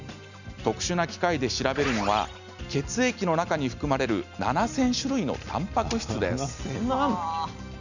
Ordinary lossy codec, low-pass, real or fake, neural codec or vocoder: none; 7.2 kHz; real; none